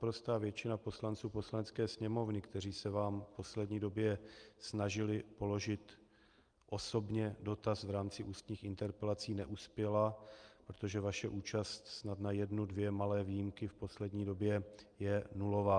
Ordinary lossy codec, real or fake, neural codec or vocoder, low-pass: Opus, 32 kbps; real; none; 9.9 kHz